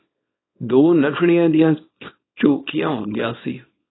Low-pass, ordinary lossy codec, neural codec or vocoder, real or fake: 7.2 kHz; AAC, 16 kbps; codec, 24 kHz, 0.9 kbps, WavTokenizer, small release; fake